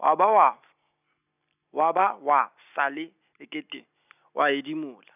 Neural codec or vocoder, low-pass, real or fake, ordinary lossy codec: none; 3.6 kHz; real; none